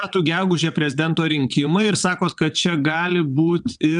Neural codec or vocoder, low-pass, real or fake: vocoder, 22.05 kHz, 80 mel bands, WaveNeXt; 9.9 kHz; fake